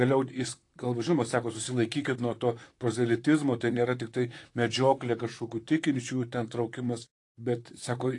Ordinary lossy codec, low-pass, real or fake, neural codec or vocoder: AAC, 48 kbps; 10.8 kHz; fake; vocoder, 44.1 kHz, 128 mel bands, Pupu-Vocoder